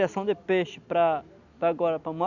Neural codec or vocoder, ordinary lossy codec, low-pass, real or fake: none; none; 7.2 kHz; real